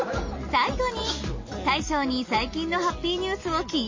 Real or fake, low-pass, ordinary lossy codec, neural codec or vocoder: real; 7.2 kHz; MP3, 32 kbps; none